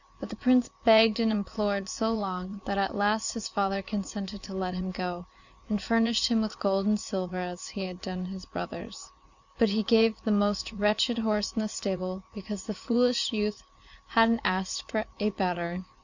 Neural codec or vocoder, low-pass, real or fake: vocoder, 44.1 kHz, 80 mel bands, Vocos; 7.2 kHz; fake